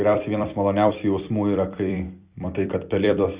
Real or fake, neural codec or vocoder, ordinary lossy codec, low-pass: fake; vocoder, 44.1 kHz, 128 mel bands every 256 samples, BigVGAN v2; Opus, 64 kbps; 3.6 kHz